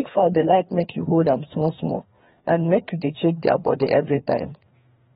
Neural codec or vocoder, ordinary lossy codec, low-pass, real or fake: codec, 16 kHz, 2 kbps, FreqCodec, larger model; AAC, 16 kbps; 7.2 kHz; fake